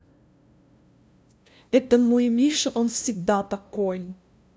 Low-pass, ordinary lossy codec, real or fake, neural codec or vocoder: none; none; fake; codec, 16 kHz, 0.5 kbps, FunCodec, trained on LibriTTS, 25 frames a second